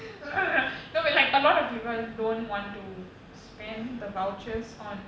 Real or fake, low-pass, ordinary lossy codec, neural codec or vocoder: real; none; none; none